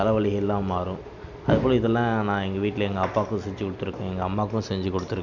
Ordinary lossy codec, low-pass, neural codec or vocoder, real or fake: none; 7.2 kHz; none; real